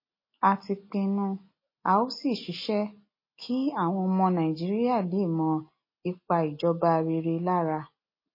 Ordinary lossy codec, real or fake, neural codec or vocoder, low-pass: MP3, 24 kbps; real; none; 5.4 kHz